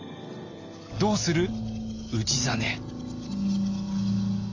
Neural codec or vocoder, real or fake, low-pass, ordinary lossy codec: none; real; 7.2 kHz; AAC, 48 kbps